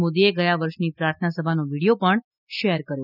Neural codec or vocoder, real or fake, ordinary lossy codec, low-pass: none; real; none; 5.4 kHz